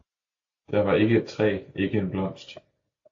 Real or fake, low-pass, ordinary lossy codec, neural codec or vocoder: real; 7.2 kHz; AAC, 48 kbps; none